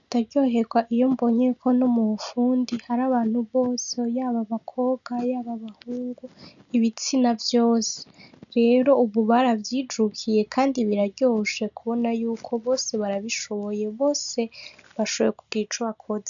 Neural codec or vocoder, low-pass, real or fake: none; 7.2 kHz; real